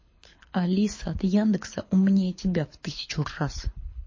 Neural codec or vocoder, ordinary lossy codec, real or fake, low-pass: codec, 24 kHz, 6 kbps, HILCodec; MP3, 32 kbps; fake; 7.2 kHz